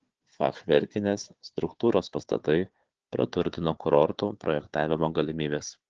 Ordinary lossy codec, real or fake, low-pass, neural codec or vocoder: Opus, 16 kbps; fake; 7.2 kHz; codec, 16 kHz, 4 kbps, FunCodec, trained on Chinese and English, 50 frames a second